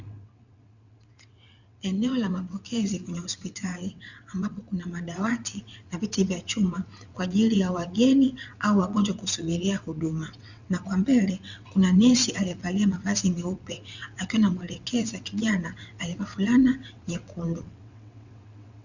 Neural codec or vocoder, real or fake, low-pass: vocoder, 22.05 kHz, 80 mel bands, WaveNeXt; fake; 7.2 kHz